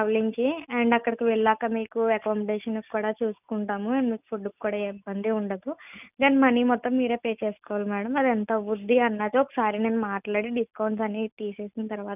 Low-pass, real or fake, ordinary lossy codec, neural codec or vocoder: 3.6 kHz; real; none; none